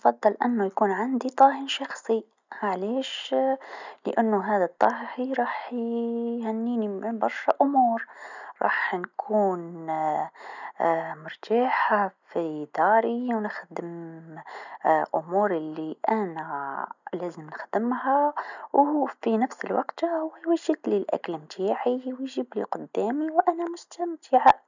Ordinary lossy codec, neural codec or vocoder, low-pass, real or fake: none; none; 7.2 kHz; real